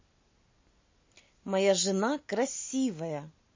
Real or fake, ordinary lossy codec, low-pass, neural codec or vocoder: real; MP3, 32 kbps; 7.2 kHz; none